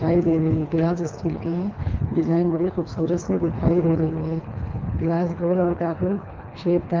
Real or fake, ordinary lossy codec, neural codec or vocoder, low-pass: fake; Opus, 24 kbps; codec, 24 kHz, 3 kbps, HILCodec; 7.2 kHz